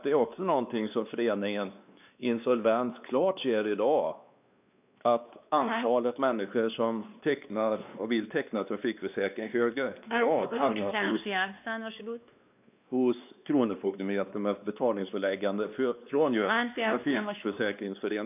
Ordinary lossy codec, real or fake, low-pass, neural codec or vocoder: none; fake; 3.6 kHz; codec, 16 kHz, 2 kbps, X-Codec, WavLM features, trained on Multilingual LibriSpeech